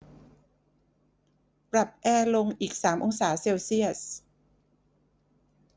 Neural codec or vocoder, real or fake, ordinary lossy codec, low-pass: none; real; none; none